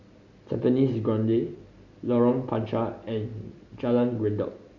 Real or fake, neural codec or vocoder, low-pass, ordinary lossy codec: real; none; 7.2 kHz; Opus, 64 kbps